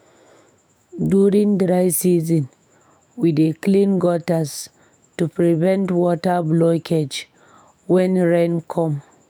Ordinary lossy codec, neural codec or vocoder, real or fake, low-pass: none; autoencoder, 48 kHz, 128 numbers a frame, DAC-VAE, trained on Japanese speech; fake; none